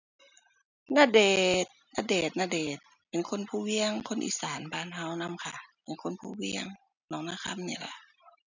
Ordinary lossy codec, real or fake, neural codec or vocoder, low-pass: none; real; none; 7.2 kHz